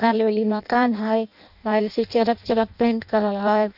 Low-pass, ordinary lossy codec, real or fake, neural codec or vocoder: 5.4 kHz; none; fake; codec, 16 kHz in and 24 kHz out, 0.6 kbps, FireRedTTS-2 codec